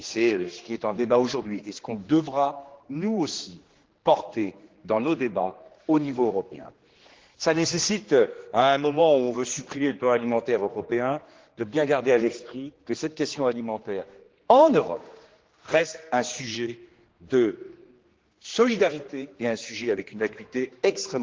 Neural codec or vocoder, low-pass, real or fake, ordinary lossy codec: codec, 16 kHz, 2 kbps, X-Codec, HuBERT features, trained on general audio; 7.2 kHz; fake; Opus, 16 kbps